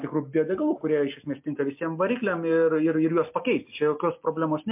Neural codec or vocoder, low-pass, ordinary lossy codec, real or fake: none; 3.6 kHz; MP3, 32 kbps; real